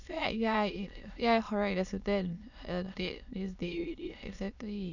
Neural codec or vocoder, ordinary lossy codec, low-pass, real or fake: autoencoder, 22.05 kHz, a latent of 192 numbers a frame, VITS, trained on many speakers; none; 7.2 kHz; fake